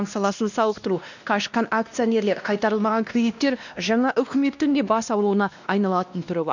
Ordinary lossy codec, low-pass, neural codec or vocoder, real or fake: none; 7.2 kHz; codec, 16 kHz, 1 kbps, X-Codec, WavLM features, trained on Multilingual LibriSpeech; fake